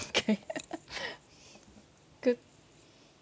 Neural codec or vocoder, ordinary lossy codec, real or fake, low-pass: none; none; real; none